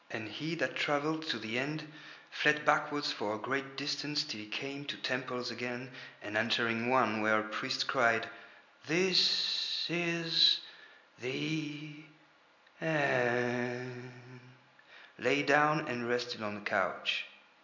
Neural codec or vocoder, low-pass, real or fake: none; 7.2 kHz; real